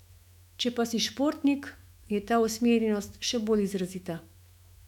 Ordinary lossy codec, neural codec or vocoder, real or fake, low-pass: none; autoencoder, 48 kHz, 128 numbers a frame, DAC-VAE, trained on Japanese speech; fake; 19.8 kHz